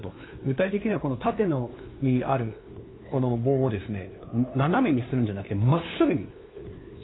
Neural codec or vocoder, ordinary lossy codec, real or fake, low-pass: codec, 16 kHz, 2 kbps, FunCodec, trained on LibriTTS, 25 frames a second; AAC, 16 kbps; fake; 7.2 kHz